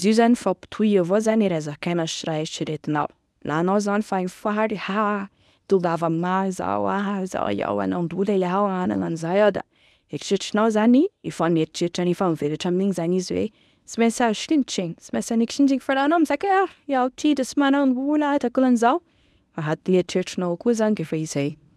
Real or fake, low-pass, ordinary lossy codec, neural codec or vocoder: fake; none; none; codec, 24 kHz, 0.9 kbps, WavTokenizer, medium speech release version 1